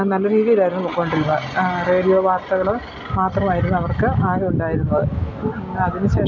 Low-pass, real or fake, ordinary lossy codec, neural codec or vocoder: 7.2 kHz; real; none; none